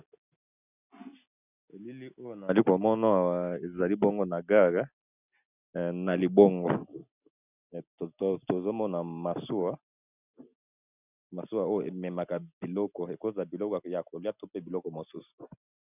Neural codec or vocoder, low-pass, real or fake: none; 3.6 kHz; real